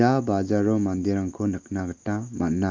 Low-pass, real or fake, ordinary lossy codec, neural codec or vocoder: 7.2 kHz; real; Opus, 24 kbps; none